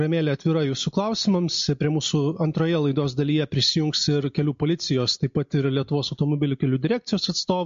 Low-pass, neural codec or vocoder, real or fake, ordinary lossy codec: 7.2 kHz; none; real; MP3, 48 kbps